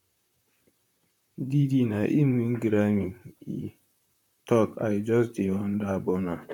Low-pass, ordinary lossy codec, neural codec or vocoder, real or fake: 19.8 kHz; none; vocoder, 44.1 kHz, 128 mel bands, Pupu-Vocoder; fake